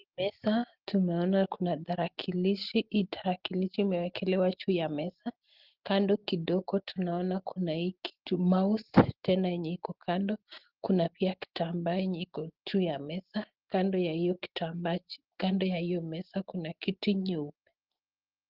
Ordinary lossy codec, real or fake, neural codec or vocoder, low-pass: Opus, 16 kbps; real; none; 5.4 kHz